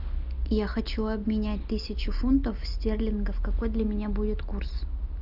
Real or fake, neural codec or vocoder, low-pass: real; none; 5.4 kHz